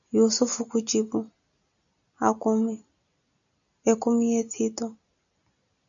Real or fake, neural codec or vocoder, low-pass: real; none; 7.2 kHz